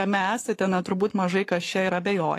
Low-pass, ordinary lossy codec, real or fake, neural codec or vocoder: 14.4 kHz; AAC, 48 kbps; fake; codec, 44.1 kHz, 7.8 kbps, Pupu-Codec